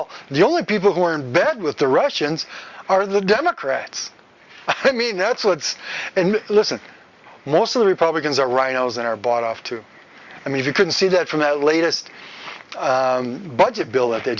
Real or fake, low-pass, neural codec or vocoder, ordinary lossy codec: real; 7.2 kHz; none; Opus, 64 kbps